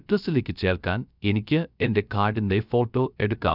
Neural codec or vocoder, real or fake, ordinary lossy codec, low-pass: codec, 16 kHz, 0.3 kbps, FocalCodec; fake; none; 5.4 kHz